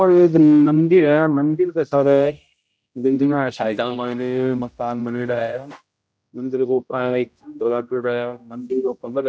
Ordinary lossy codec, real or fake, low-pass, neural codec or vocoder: none; fake; none; codec, 16 kHz, 0.5 kbps, X-Codec, HuBERT features, trained on general audio